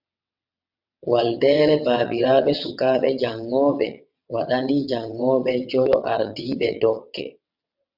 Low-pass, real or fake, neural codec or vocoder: 5.4 kHz; fake; vocoder, 22.05 kHz, 80 mel bands, WaveNeXt